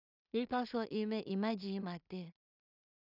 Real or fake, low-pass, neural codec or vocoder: fake; 5.4 kHz; codec, 16 kHz in and 24 kHz out, 0.4 kbps, LongCat-Audio-Codec, two codebook decoder